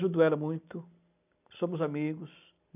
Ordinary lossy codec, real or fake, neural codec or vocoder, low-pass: none; real; none; 3.6 kHz